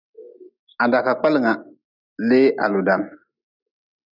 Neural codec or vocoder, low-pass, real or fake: none; 5.4 kHz; real